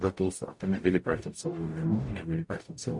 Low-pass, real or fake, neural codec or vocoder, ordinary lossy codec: 10.8 kHz; fake; codec, 44.1 kHz, 0.9 kbps, DAC; MP3, 48 kbps